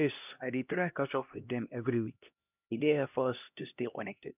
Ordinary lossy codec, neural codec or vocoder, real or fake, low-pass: none; codec, 16 kHz, 1 kbps, X-Codec, HuBERT features, trained on LibriSpeech; fake; 3.6 kHz